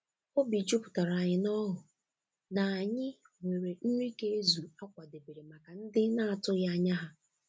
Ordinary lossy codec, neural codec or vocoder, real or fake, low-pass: none; none; real; none